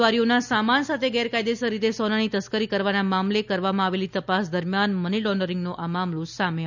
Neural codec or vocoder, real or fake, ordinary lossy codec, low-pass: none; real; none; 7.2 kHz